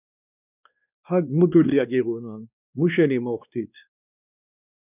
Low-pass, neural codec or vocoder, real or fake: 3.6 kHz; codec, 16 kHz, 2 kbps, X-Codec, WavLM features, trained on Multilingual LibriSpeech; fake